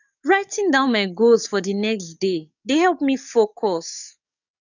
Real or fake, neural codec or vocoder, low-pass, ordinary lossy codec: fake; codec, 44.1 kHz, 7.8 kbps, DAC; 7.2 kHz; none